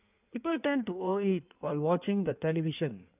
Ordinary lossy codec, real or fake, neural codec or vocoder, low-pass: none; fake; codec, 16 kHz in and 24 kHz out, 1.1 kbps, FireRedTTS-2 codec; 3.6 kHz